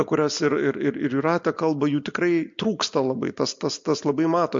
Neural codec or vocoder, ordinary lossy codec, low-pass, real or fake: none; MP3, 48 kbps; 7.2 kHz; real